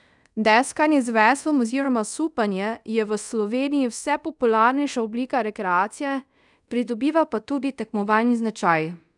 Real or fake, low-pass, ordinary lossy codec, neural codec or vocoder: fake; 10.8 kHz; none; codec, 24 kHz, 0.5 kbps, DualCodec